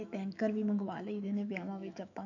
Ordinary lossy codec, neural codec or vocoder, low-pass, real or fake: none; autoencoder, 48 kHz, 128 numbers a frame, DAC-VAE, trained on Japanese speech; 7.2 kHz; fake